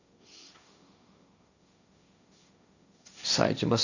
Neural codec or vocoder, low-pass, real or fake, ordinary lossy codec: codec, 16 kHz, 1.1 kbps, Voila-Tokenizer; 7.2 kHz; fake; none